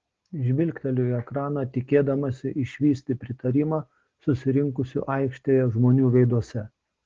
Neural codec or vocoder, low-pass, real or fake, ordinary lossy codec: none; 7.2 kHz; real; Opus, 16 kbps